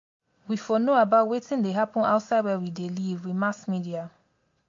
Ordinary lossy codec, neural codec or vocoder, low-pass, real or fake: MP3, 48 kbps; none; 7.2 kHz; real